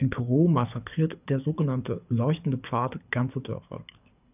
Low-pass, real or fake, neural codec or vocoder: 3.6 kHz; fake; codec, 24 kHz, 6 kbps, HILCodec